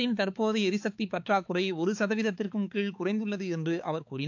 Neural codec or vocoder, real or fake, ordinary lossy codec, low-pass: codec, 16 kHz, 4 kbps, X-Codec, HuBERT features, trained on balanced general audio; fake; AAC, 48 kbps; 7.2 kHz